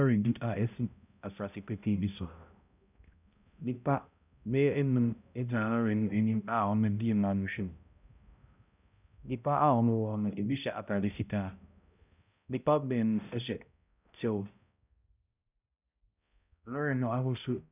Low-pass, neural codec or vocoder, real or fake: 3.6 kHz; codec, 16 kHz, 0.5 kbps, X-Codec, HuBERT features, trained on balanced general audio; fake